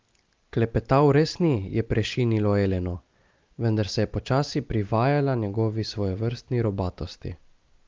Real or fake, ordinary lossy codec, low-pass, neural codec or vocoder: real; Opus, 32 kbps; 7.2 kHz; none